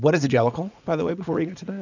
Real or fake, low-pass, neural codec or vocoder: fake; 7.2 kHz; vocoder, 44.1 kHz, 80 mel bands, Vocos